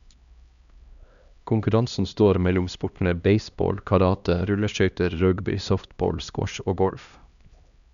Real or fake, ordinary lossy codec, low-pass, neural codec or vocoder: fake; none; 7.2 kHz; codec, 16 kHz, 1 kbps, X-Codec, HuBERT features, trained on LibriSpeech